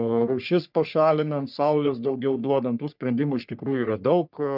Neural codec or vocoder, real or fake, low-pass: codec, 44.1 kHz, 3.4 kbps, Pupu-Codec; fake; 5.4 kHz